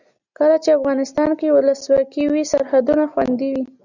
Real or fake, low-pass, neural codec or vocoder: real; 7.2 kHz; none